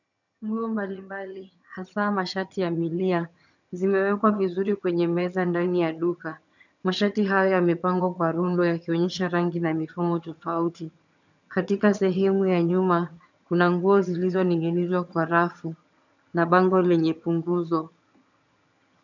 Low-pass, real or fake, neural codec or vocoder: 7.2 kHz; fake; vocoder, 22.05 kHz, 80 mel bands, HiFi-GAN